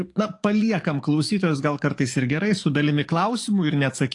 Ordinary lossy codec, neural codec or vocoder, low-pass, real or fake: AAC, 48 kbps; codec, 44.1 kHz, 7.8 kbps, DAC; 10.8 kHz; fake